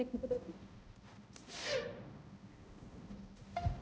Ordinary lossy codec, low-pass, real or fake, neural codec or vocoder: none; none; fake; codec, 16 kHz, 0.5 kbps, X-Codec, HuBERT features, trained on balanced general audio